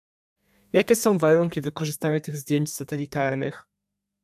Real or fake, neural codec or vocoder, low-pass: fake; codec, 32 kHz, 1.9 kbps, SNAC; 14.4 kHz